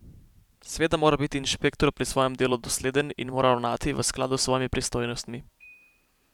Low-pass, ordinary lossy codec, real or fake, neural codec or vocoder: 19.8 kHz; none; fake; vocoder, 44.1 kHz, 128 mel bands every 512 samples, BigVGAN v2